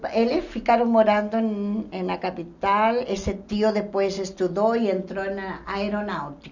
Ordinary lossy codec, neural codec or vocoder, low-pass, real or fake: none; none; 7.2 kHz; real